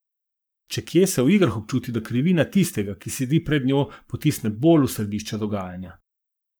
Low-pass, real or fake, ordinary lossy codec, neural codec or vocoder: none; fake; none; codec, 44.1 kHz, 7.8 kbps, Pupu-Codec